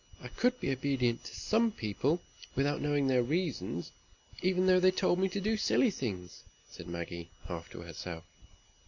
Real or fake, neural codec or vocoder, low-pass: real; none; 7.2 kHz